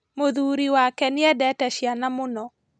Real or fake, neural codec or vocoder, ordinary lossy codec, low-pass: real; none; none; none